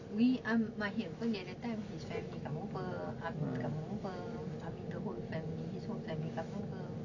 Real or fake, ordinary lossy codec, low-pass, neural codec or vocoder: real; none; 7.2 kHz; none